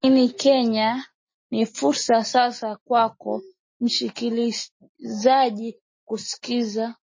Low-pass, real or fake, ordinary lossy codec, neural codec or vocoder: 7.2 kHz; real; MP3, 32 kbps; none